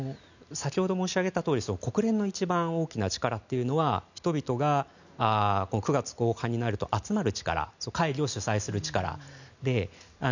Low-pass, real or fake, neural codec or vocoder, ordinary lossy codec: 7.2 kHz; real; none; none